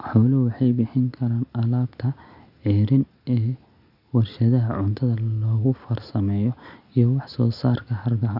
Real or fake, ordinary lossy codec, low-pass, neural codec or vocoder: real; none; 5.4 kHz; none